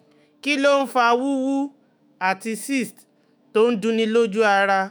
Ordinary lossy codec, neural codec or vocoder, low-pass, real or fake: none; autoencoder, 48 kHz, 128 numbers a frame, DAC-VAE, trained on Japanese speech; none; fake